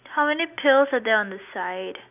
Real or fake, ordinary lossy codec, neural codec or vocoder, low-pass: real; none; none; 3.6 kHz